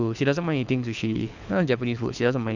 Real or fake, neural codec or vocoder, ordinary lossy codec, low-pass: fake; codec, 24 kHz, 6 kbps, HILCodec; none; 7.2 kHz